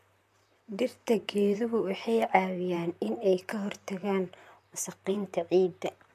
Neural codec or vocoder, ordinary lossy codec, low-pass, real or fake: vocoder, 44.1 kHz, 128 mel bands, Pupu-Vocoder; MP3, 64 kbps; 14.4 kHz; fake